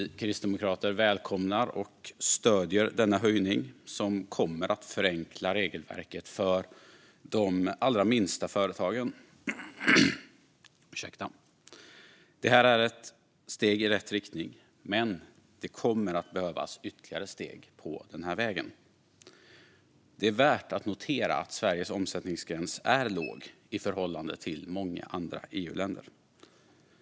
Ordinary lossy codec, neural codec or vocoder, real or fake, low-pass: none; none; real; none